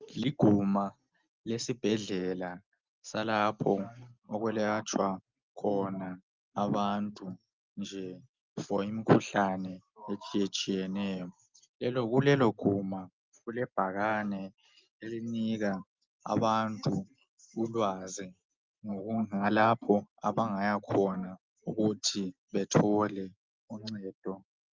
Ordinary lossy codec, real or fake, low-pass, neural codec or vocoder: Opus, 24 kbps; real; 7.2 kHz; none